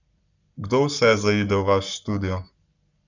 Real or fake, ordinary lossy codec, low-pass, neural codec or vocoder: fake; none; 7.2 kHz; codec, 44.1 kHz, 7.8 kbps, Pupu-Codec